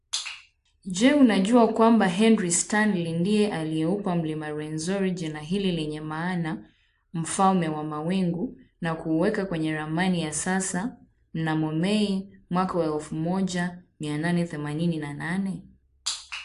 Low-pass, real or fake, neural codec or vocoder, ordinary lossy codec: 10.8 kHz; real; none; AAC, 64 kbps